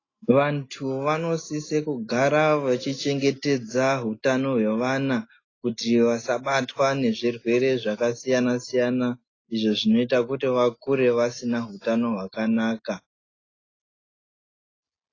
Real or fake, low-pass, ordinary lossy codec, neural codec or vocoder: real; 7.2 kHz; AAC, 32 kbps; none